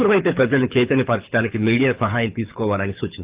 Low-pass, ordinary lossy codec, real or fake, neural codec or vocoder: 3.6 kHz; Opus, 24 kbps; fake; codec, 24 kHz, 6 kbps, HILCodec